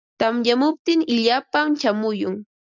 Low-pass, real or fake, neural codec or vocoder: 7.2 kHz; real; none